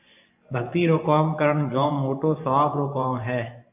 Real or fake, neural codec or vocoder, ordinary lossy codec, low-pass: fake; vocoder, 24 kHz, 100 mel bands, Vocos; AAC, 32 kbps; 3.6 kHz